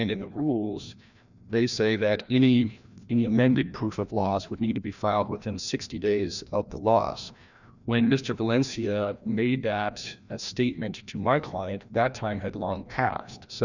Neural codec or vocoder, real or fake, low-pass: codec, 16 kHz, 1 kbps, FreqCodec, larger model; fake; 7.2 kHz